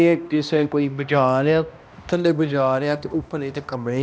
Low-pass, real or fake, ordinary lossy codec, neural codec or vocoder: none; fake; none; codec, 16 kHz, 1 kbps, X-Codec, HuBERT features, trained on balanced general audio